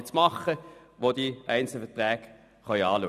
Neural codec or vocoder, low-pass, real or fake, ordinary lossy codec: none; 14.4 kHz; real; none